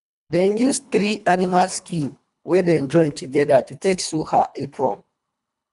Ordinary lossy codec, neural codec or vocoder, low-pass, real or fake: none; codec, 24 kHz, 1.5 kbps, HILCodec; 10.8 kHz; fake